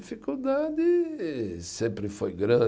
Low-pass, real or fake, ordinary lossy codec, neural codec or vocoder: none; real; none; none